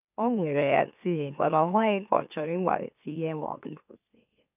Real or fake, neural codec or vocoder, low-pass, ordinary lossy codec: fake; autoencoder, 44.1 kHz, a latent of 192 numbers a frame, MeloTTS; 3.6 kHz; none